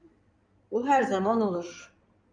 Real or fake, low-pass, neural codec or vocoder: fake; 9.9 kHz; codec, 16 kHz in and 24 kHz out, 2.2 kbps, FireRedTTS-2 codec